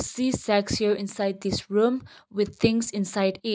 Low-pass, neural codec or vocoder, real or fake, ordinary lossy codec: none; none; real; none